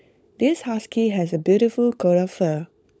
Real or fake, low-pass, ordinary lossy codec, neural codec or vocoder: fake; none; none; codec, 16 kHz, 16 kbps, FunCodec, trained on LibriTTS, 50 frames a second